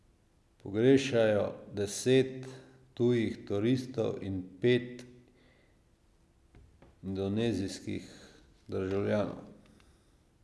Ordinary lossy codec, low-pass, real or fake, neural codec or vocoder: none; none; real; none